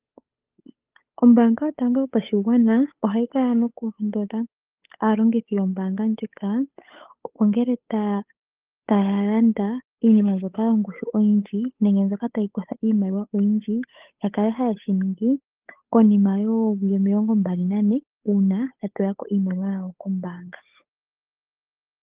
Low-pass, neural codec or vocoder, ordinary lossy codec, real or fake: 3.6 kHz; codec, 16 kHz, 8 kbps, FunCodec, trained on Chinese and English, 25 frames a second; Opus, 32 kbps; fake